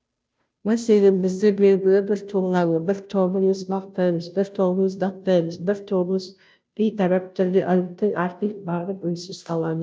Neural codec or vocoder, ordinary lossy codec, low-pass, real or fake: codec, 16 kHz, 0.5 kbps, FunCodec, trained on Chinese and English, 25 frames a second; none; none; fake